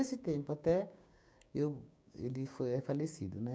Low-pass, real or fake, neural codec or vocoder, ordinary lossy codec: none; fake; codec, 16 kHz, 6 kbps, DAC; none